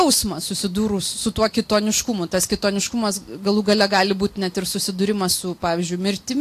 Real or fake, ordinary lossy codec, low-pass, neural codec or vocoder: real; AAC, 64 kbps; 14.4 kHz; none